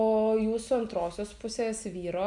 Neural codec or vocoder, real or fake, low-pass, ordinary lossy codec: none; real; 10.8 kHz; MP3, 96 kbps